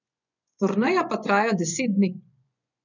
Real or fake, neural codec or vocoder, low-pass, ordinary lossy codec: fake; vocoder, 24 kHz, 100 mel bands, Vocos; 7.2 kHz; none